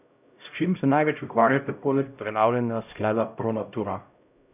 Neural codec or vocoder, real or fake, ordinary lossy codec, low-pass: codec, 16 kHz, 0.5 kbps, X-Codec, HuBERT features, trained on LibriSpeech; fake; none; 3.6 kHz